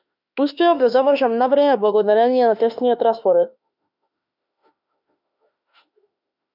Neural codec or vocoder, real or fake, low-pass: autoencoder, 48 kHz, 32 numbers a frame, DAC-VAE, trained on Japanese speech; fake; 5.4 kHz